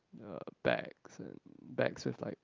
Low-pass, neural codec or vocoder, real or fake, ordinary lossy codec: 7.2 kHz; none; real; Opus, 32 kbps